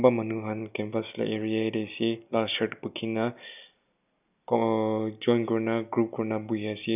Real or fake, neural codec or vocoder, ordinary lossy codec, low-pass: real; none; none; 3.6 kHz